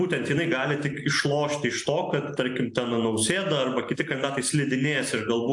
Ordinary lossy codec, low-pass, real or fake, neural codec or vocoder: AAC, 64 kbps; 10.8 kHz; real; none